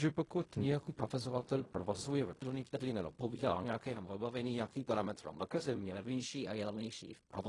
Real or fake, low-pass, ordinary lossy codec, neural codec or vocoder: fake; 10.8 kHz; AAC, 32 kbps; codec, 16 kHz in and 24 kHz out, 0.4 kbps, LongCat-Audio-Codec, fine tuned four codebook decoder